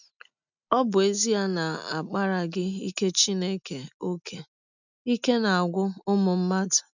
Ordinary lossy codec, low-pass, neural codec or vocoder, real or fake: none; 7.2 kHz; none; real